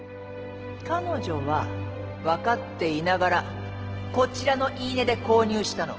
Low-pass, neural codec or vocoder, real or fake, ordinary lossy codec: 7.2 kHz; none; real; Opus, 16 kbps